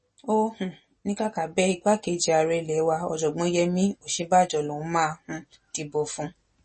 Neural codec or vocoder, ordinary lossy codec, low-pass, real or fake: none; MP3, 32 kbps; 9.9 kHz; real